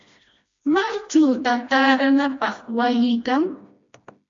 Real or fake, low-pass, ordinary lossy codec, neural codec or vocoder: fake; 7.2 kHz; MP3, 48 kbps; codec, 16 kHz, 1 kbps, FreqCodec, smaller model